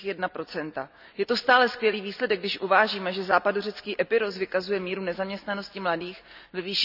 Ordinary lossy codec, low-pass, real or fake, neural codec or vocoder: none; 5.4 kHz; real; none